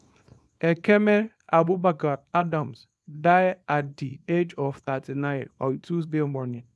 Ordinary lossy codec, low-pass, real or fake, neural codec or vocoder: none; none; fake; codec, 24 kHz, 0.9 kbps, WavTokenizer, small release